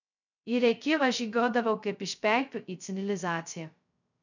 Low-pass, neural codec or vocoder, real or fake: 7.2 kHz; codec, 16 kHz, 0.2 kbps, FocalCodec; fake